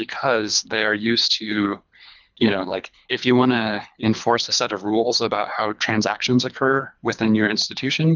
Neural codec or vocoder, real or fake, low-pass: codec, 24 kHz, 3 kbps, HILCodec; fake; 7.2 kHz